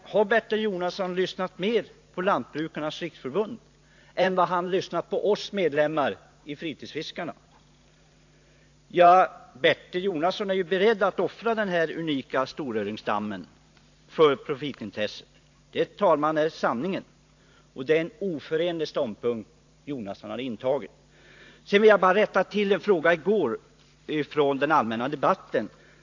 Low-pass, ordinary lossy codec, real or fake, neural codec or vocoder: 7.2 kHz; AAC, 48 kbps; fake; vocoder, 44.1 kHz, 128 mel bands every 512 samples, BigVGAN v2